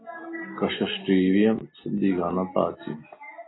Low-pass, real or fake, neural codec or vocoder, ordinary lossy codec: 7.2 kHz; real; none; AAC, 16 kbps